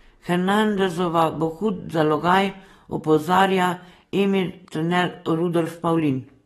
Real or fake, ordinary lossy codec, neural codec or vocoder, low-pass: fake; AAC, 32 kbps; codec, 44.1 kHz, 7.8 kbps, DAC; 19.8 kHz